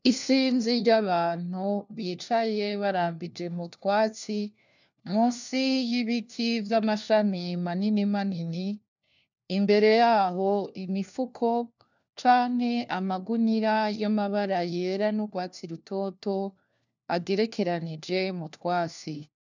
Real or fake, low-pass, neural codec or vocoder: fake; 7.2 kHz; codec, 16 kHz, 1 kbps, FunCodec, trained on LibriTTS, 50 frames a second